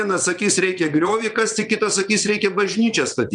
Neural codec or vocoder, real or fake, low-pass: vocoder, 22.05 kHz, 80 mel bands, WaveNeXt; fake; 9.9 kHz